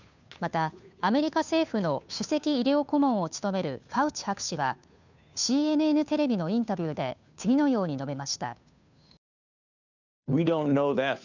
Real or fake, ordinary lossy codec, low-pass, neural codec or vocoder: fake; none; 7.2 kHz; codec, 16 kHz, 2 kbps, FunCodec, trained on Chinese and English, 25 frames a second